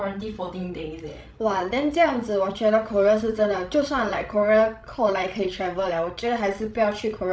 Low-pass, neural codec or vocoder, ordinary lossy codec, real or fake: none; codec, 16 kHz, 16 kbps, FreqCodec, larger model; none; fake